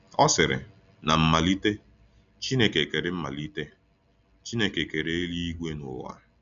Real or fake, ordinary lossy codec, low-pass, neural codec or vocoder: real; none; 7.2 kHz; none